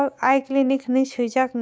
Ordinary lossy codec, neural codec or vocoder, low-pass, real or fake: none; codec, 16 kHz, 6 kbps, DAC; none; fake